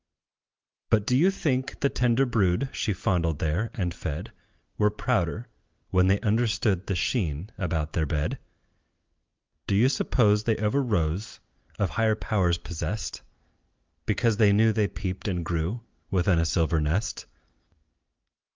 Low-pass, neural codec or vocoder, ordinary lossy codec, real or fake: 7.2 kHz; none; Opus, 32 kbps; real